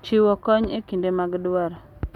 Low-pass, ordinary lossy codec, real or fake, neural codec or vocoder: 19.8 kHz; none; real; none